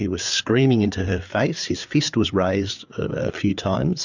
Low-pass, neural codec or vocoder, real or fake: 7.2 kHz; codec, 16 kHz, 4 kbps, FreqCodec, larger model; fake